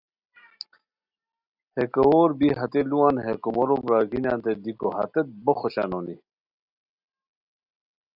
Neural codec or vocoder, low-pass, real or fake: none; 5.4 kHz; real